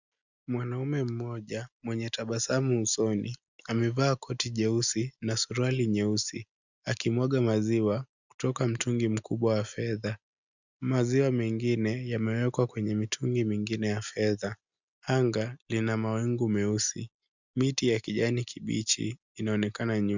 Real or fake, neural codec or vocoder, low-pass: real; none; 7.2 kHz